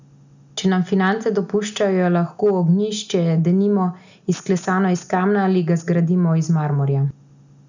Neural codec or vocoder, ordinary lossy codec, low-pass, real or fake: none; none; 7.2 kHz; real